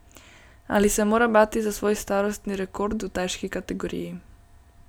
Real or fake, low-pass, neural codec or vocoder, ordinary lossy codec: real; none; none; none